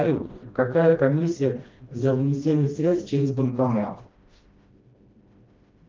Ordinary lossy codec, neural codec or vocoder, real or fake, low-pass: Opus, 24 kbps; codec, 16 kHz, 1 kbps, FreqCodec, smaller model; fake; 7.2 kHz